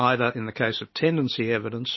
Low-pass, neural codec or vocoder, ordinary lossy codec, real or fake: 7.2 kHz; none; MP3, 24 kbps; real